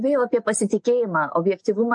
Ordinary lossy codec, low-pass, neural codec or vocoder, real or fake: MP3, 48 kbps; 10.8 kHz; none; real